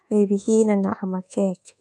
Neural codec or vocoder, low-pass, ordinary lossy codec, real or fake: codec, 24 kHz, 1.2 kbps, DualCodec; none; none; fake